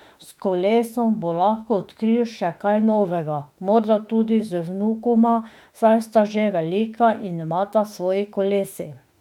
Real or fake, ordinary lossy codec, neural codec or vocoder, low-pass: fake; MP3, 96 kbps; autoencoder, 48 kHz, 32 numbers a frame, DAC-VAE, trained on Japanese speech; 19.8 kHz